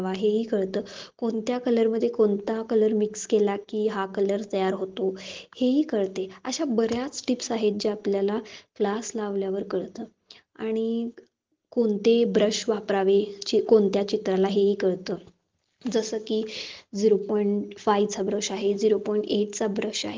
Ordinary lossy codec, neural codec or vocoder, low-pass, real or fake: Opus, 16 kbps; none; 7.2 kHz; real